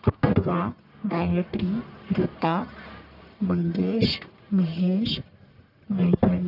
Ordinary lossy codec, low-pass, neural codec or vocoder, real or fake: none; 5.4 kHz; codec, 44.1 kHz, 1.7 kbps, Pupu-Codec; fake